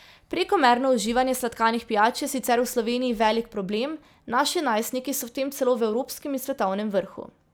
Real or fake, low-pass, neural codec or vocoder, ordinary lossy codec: real; none; none; none